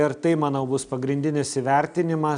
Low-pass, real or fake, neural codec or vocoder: 9.9 kHz; real; none